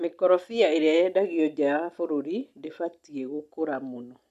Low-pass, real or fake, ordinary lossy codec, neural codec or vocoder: 14.4 kHz; real; none; none